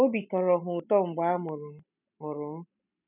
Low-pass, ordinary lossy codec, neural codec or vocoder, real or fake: 3.6 kHz; none; none; real